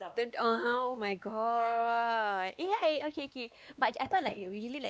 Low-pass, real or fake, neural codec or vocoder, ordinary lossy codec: none; fake; codec, 16 kHz, 2 kbps, X-Codec, WavLM features, trained on Multilingual LibriSpeech; none